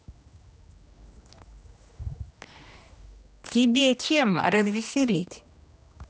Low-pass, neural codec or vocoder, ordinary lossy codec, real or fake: none; codec, 16 kHz, 1 kbps, X-Codec, HuBERT features, trained on general audio; none; fake